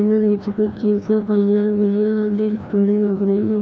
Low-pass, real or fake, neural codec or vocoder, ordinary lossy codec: none; fake; codec, 16 kHz, 1 kbps, FreqCodec, larger model; none